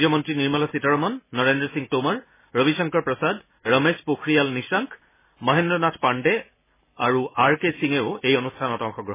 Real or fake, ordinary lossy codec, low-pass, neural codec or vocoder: real; MP3, 16 kbps; 3.6 kHz; none